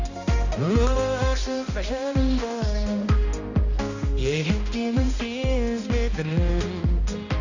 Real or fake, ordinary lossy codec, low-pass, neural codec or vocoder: fake; none; 7.2 kHz; codec, 16 kHz, 1 kbps, X-Codec, HuBERT features, trained on balanced general audio